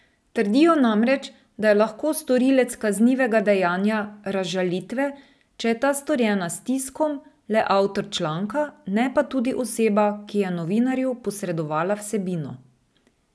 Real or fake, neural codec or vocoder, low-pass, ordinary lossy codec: real; none; none; none